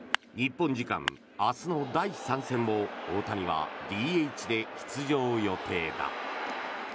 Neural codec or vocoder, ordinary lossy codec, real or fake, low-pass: none; none; real; none